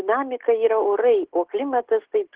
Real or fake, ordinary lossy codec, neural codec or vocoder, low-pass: real; Opus, 16 kbps; none; 3.6 kHz